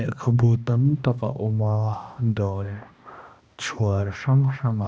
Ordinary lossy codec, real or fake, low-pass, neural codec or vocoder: none; fake; none; codec, 16 kHz, 1 kbps, X-Codec, HuBERT features, trained on balanced general audio